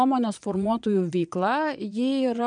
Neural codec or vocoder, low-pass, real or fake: vocoder, 22.05 kHz, 80 mel bands, Vocos; 9.9 kHz; fake